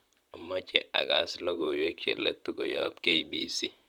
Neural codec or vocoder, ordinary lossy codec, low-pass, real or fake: vocoder, 44.1 kHz, 128 mel bands, Pupu-Vocoder; none; 19.8 kHz; fake